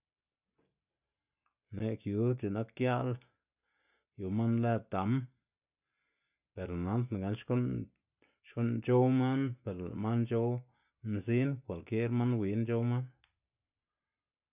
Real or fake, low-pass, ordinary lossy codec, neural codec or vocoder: real; 3.6 kHz; none; none